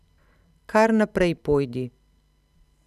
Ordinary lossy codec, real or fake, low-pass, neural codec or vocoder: none; real; 14.4 kHz; none